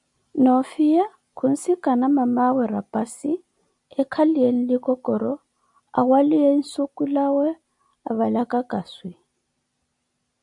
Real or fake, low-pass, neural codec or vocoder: real; 10.8 kHz; none